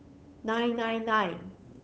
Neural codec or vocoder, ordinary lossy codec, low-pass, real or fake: codec, 16 kHz, 8 kbps, FunCodec, trained on Chinese and English, 25 frames a second; none; none; fake